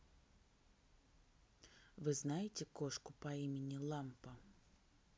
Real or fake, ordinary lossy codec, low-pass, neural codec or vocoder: real; none; none; none